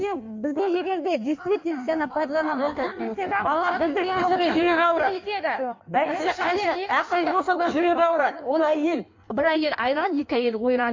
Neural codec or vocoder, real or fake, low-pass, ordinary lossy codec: codec, 16 kHz in and 24 kHz out, 1.1 kbps, FireRedTTS-2 codec; fake; 7.2 kHz; MP3, 48 kbps